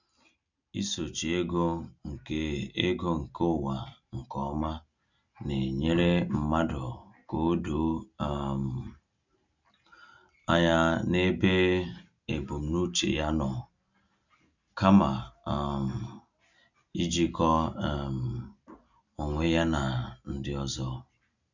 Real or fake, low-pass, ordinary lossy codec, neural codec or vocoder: real; 7.2 kHz; none; none